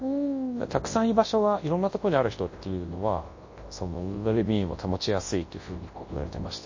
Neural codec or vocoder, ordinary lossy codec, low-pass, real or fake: codec, 24 kHz, 0.9 kbps, WavTokenizer, large speech release; MP3, 32 kbps; 7.2 kHz; fake